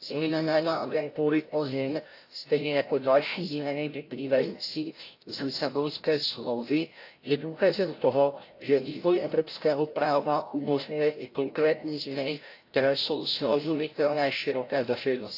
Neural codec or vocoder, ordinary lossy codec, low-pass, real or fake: codec, 16 kHz, 0.5 kbps, FreqCodec, larger model; AAC, 32 kbps; 5.4 kHz; fake